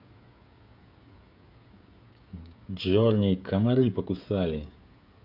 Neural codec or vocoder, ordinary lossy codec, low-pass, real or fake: codec, 16 kHz, 16 kbps, FreqCodec, smaller model; none; 5.4 kHz; fake